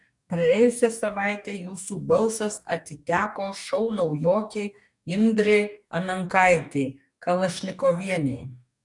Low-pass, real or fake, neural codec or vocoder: 10.8 kHz; fake; codec, 44.1 kHz, 2.6 kbps, DAC